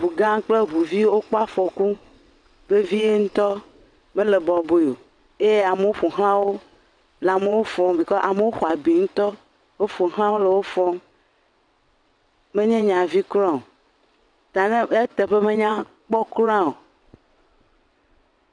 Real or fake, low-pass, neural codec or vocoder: fake; 9.9 kHz; vocoder, 22.05 kHz, 80 mel bands, WaveNeXt